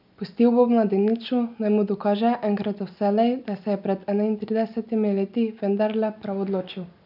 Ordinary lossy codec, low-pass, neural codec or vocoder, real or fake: none; 5.4 kHz; none; real